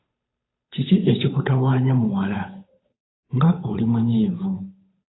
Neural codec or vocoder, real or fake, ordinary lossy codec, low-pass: codec, 16 kHz, 8 kbps, FunCodec, trained on Chinese and English, 25 frames a second; fake; AAC, 16 kbps; 7.2 kHz